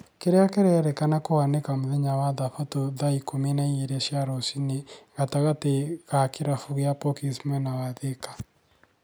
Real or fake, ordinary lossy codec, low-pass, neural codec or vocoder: real; none; none; none